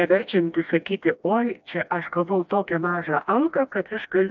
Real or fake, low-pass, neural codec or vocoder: fake; 7.2 kHz; codec, 16 kHz, 1 kbps, FreqCodec, smaller model